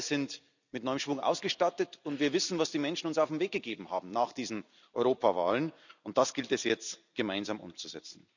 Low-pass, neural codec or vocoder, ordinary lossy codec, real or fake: 7.2 kHz; vocoder, 44.1 kHz, 128 mel bands every 256 samples, BigVGAN v2; none; fake